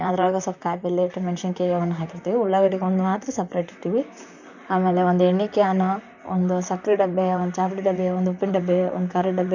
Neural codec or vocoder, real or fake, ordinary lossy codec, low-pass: vocoder, 44.1 kHz, 128 mel bands, Pupu-Vocoder; fake; none; 7.2 kHz